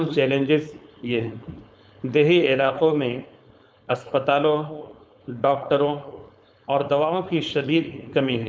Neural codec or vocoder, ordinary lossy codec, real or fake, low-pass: codec, 16 kHz, 4.8 kbps, FACodec; none; fake; none